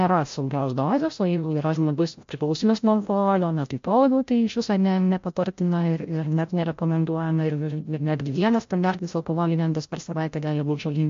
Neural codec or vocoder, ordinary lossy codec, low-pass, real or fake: codec, 16 kHz, 0.5 kbps, FreqCodec, larger model; MP3, 48 kbps; 7.2 kHz; fake